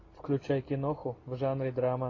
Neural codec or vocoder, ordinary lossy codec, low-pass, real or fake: none; AAC, 32 kbps; 7.2 kHz; real